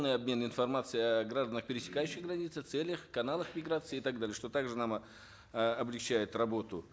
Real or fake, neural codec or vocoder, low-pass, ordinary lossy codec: real; none; none; none